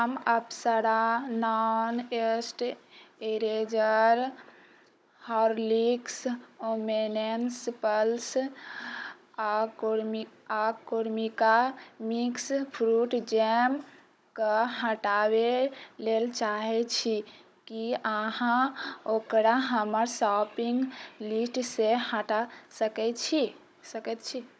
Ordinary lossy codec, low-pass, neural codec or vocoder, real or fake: none; none; codec, 16 kHz, 16 kbps, FunCodec, trained on Chinese and English, 50 frames a second; fake